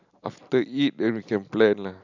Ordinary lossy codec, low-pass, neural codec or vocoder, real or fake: none; 7.2 kHz; none; real